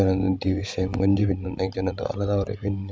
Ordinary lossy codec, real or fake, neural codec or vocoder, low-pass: none; fake; codec, 16 kHz, 16 kbps, FreqCodec, larger model; none